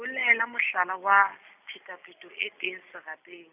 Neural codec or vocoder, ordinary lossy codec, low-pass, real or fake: none; none; 3.6 kHz; real